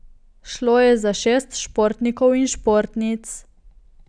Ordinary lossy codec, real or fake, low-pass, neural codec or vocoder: none; real; 9.9 kHz; none